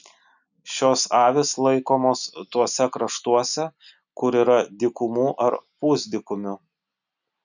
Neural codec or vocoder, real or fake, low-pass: none; real; 7.2 kHz